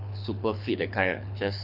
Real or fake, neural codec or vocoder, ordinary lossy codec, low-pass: fake; codec, 24 kHz, 6 kbps, HILCodec; none; 5.4 kHz